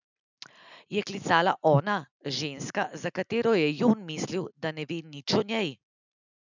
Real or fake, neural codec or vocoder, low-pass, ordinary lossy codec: real; none; 7.2 kHz; none